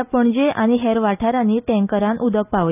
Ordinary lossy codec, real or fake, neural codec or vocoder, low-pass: none; real; none; 3.6 kHz